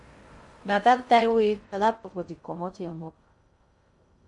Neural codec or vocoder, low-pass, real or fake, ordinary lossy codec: codec, 16 kHz in and 24 kHz out, 0.6 kbps, FocalCodec, streaming, 2048 codes; 10.8 kHz; fake; MP3, 48 kbps